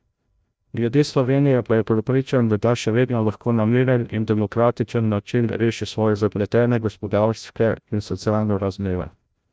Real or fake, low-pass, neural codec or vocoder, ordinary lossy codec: fake; none; codec, 16 kHz, 0.5 kbps, FreqCodec, larger model; none